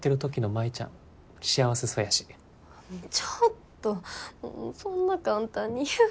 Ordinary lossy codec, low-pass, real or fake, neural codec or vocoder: none; none; real; none